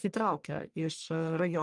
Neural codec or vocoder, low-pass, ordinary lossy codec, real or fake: codec, 44.1 kHz, 1.7 kbps, Pupu-Codec; 10.8 kHz; Opus, 32 kbps; fake